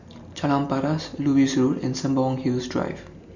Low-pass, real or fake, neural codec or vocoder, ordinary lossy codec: 7.2 kHz; real; none; none